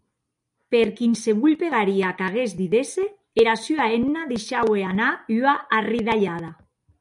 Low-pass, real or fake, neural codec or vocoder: 10.8 kHz; real; none